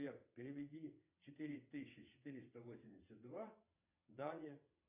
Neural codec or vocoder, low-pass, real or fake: vocoder, 22.05 kHz, 80 mel bands, WaveNeXt; 3.6 kHz; fake